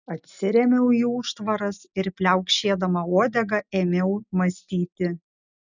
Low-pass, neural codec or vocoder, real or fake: 7.2 kHz; none; real